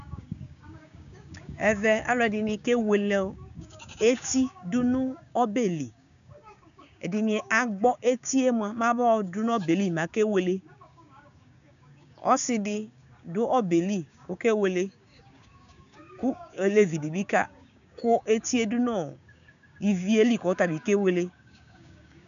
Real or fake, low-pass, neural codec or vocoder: fake; 7.2 kHz; codec, 16 kHz, 6 kbps, DAC